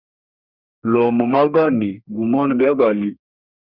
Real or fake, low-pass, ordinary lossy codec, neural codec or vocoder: fake; 5.4 kHz; Opus, 64 kbps; codec, 32 kHz, 1.9 kbps, SNAC